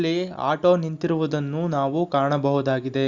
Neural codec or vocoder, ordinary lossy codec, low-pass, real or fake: none; Opus, 64 kbps; 7.2 kHz; real